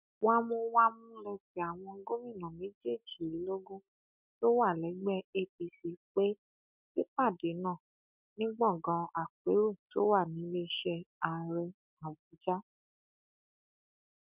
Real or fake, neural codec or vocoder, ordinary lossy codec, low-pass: real; none; none; 3.6 kHz